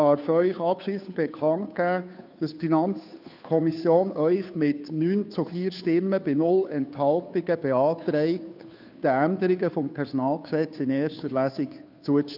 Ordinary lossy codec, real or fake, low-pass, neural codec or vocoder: none; fake; 5.4 kHz; codec, 16 kHz, 2 kbps, FunCodec, trained on Chinese and English, 25 frames a second